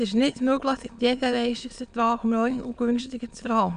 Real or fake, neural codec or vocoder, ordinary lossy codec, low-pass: fake; autoencoder, 22.05 kHz, a latent of 192 numbers a frame, VITS, trained on many speakers; none; 9.9 kHz